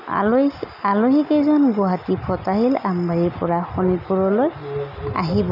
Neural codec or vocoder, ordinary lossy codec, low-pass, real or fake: none; none; 5.4 kHz; real